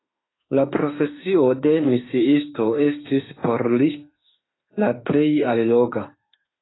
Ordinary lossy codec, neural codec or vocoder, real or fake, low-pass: AAC, 16 kbps; autoencoder, 48 kHz, 32 numbers a frame, DAC-VAE, trained on Japanese speech; fake; 7.2 kHz